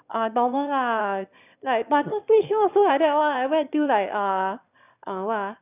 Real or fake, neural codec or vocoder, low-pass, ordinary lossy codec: fake; autoencoder, 22.05 kHz, a latent of 192 numbers a frame, VITS, trained on one speaker; 3.6 kHz; none